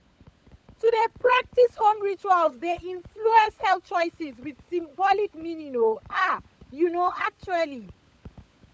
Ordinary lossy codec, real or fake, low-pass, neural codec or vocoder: none; fake; none; codec, 16 kHz, 16 kbps, FunCodec, trained on LibriTTS, 50 frames a second